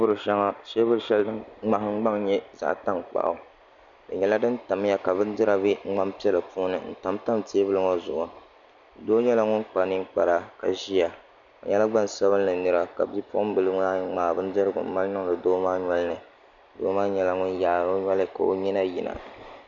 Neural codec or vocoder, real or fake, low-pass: none; real; 7.2 kHz